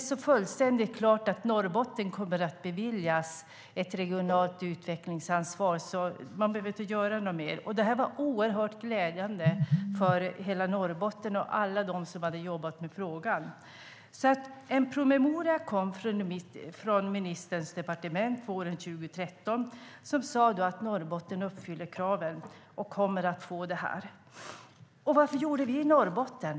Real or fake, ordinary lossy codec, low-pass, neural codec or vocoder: real; none; none; none